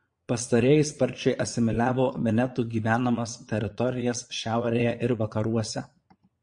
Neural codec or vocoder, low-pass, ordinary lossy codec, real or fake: vocoder, 22.05 kHz, 80 mel bands, Vocos; 9.9 kHz; MP3, 48 kbps; fake